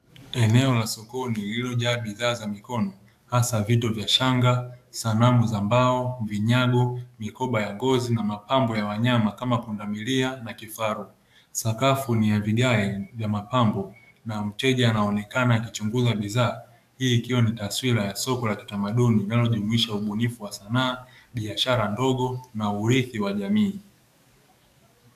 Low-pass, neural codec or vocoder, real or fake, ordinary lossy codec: 14.4 kHz; codec, 44.1 kHz, 7.8 kbps, DAC; fake; AAC, 96 kbps